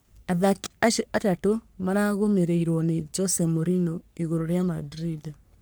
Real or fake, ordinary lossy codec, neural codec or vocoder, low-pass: fake; none; codec, 44.1 kHz, 3.4 kbps, Pupu-Codec; none